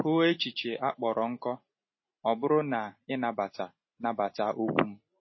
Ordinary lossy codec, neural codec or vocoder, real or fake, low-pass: MP3, 24 kbps; none; real; 7.2 kHz